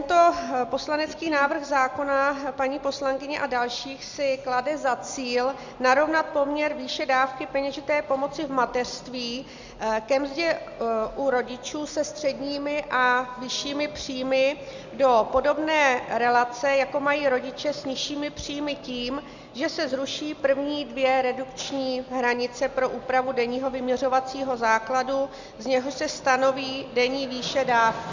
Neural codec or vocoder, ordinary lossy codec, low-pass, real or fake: none; Opus, 64 kbps; 7.2 kHz; real